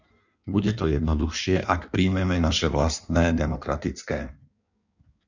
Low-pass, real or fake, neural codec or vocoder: 7.2 kHz; fake; codec, 16 kHz in and 24 kHz out, 1.1 kbps, FireRedTTS-2 codec